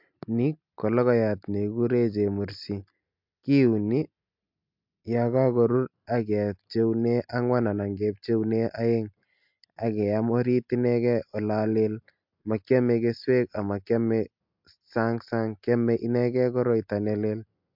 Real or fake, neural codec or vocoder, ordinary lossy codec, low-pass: real; none; MP3, 48 kbps; 5.4 kHz